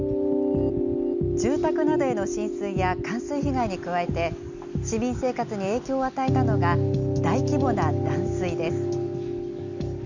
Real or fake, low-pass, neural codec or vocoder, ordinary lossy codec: real; 7.2 kHz; none; none